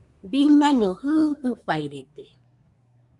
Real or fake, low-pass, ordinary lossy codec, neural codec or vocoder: fake; 10.8 kHz; MP3, 96 kbps; codec, 24 kHz, 1 kbps, SNAC